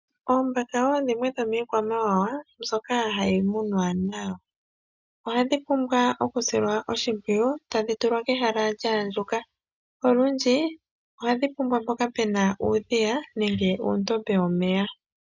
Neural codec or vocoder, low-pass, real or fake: none; 7.2 kHz; real